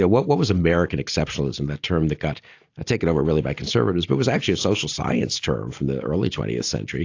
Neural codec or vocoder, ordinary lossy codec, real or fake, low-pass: none; AAC, 48 kbps; real; 7.2 kHz